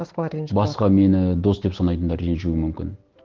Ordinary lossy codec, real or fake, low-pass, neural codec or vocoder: Opus, 16 kbps; real; 7.2 kHz; none